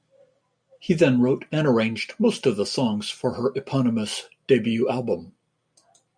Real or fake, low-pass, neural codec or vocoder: real; 9.9 kHz; none